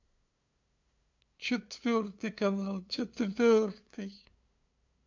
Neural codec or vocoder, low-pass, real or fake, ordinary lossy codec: codec, 16 kHz, 2 kbps, FunCodec, trained on LibriTTS, 25 frames a second; 7.2 kHz; fake; AAC, 48 kbps